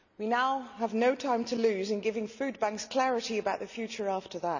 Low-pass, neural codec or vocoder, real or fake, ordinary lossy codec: 7.2 kHz; none; real; none